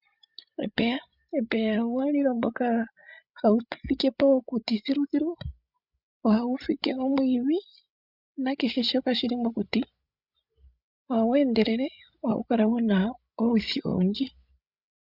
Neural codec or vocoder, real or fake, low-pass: codec, 16 kHz, 16 kbps, FreqCodec, larger model; fake; 5.4 kHz